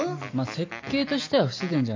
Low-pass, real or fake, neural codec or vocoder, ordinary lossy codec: 7.2 kHz; real; none; MP3, 48 kbps